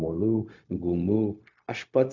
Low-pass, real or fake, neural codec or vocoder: 7.2 kHz; fake; codec, 16 kHz, 0.4 kbps, LongCat-Audio-Codec